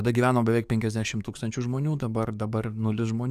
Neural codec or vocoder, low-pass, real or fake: codec, 44.1 kHz, 7.8 kbps, DAC; 14.4 kHz; fake